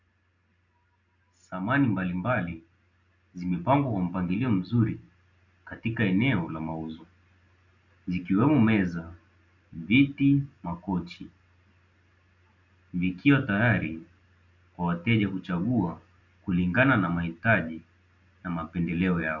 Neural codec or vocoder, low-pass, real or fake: none; 7.2 kHz; real